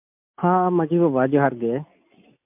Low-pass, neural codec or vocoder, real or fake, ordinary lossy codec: 3.6 kHz; none; real; MP3, 32 kbps